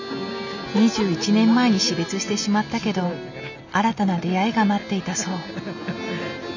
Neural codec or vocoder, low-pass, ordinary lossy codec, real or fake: none; 7.2 kHz; none; real